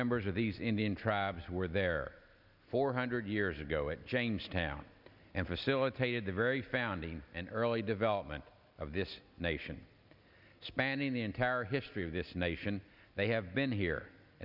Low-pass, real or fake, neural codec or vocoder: 5.4 kHz; real; none